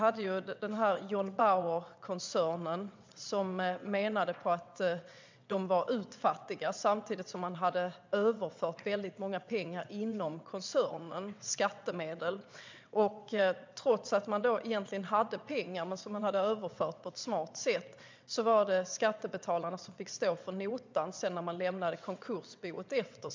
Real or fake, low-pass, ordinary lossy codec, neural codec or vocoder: fake; 7.2 kHz; MP3, 64 kbps; vocoder, 22.05 kHz, 80 mel bands, WaveNeXt